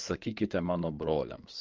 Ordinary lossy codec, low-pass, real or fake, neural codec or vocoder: Opus, 32 kbps; 7.2 kHz; fake; codec, 16 kHz in and 24 kHz out, 2.2 kbps, FireRedTTS-2 codec